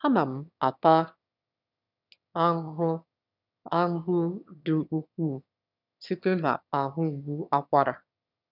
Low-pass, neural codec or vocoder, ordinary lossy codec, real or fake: 5.4 kHz; autoencoder, 22.05 kHz, a latent of 192 numbers a frame, VITS, trained on one speaker; none; fake